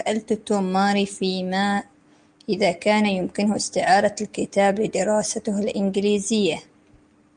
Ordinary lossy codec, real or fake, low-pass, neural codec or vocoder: Opus, 32 kbps; real; 9.9 kHz; none